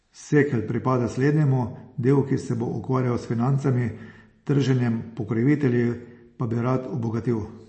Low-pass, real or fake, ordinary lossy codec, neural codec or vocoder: 10.8 kHz; real; MP3, 32 kbps; none